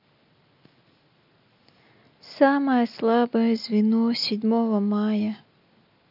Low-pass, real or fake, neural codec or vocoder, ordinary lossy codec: 5.4 kHz; real; none; none